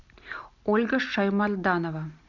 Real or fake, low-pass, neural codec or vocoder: real; 7.2 kHz; none